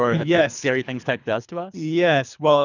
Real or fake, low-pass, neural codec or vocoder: fake; 7.2 kHz; codec, 24 kHz, 3 kbps, HILCodec